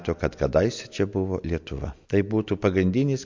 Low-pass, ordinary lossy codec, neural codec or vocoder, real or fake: 7.2 kHz; MP3, 48 kbps; none; real